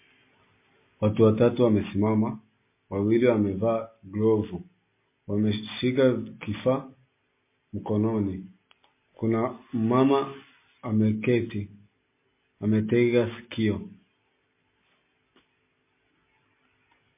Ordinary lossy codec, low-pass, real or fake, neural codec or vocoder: MP3, 24 kbps; 3.6 kHz; real; none